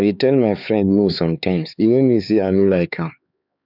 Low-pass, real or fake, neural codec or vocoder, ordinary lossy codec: 5.4 kHz; fake; codec, 16 kHz, 4 kbps, X-Codec, HuBERT features, trained on LibriSpeech; none